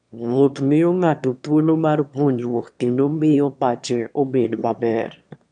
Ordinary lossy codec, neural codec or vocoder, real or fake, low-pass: none; autoencoder, 22.05 kHz, a latent of 192 numbers a frame, VITS, trained on one speaker; fake; 9.9 kHz